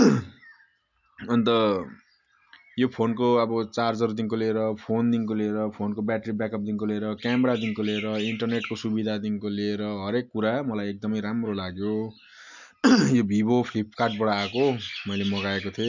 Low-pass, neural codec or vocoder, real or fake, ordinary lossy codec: 7.2 kHz; none; real; none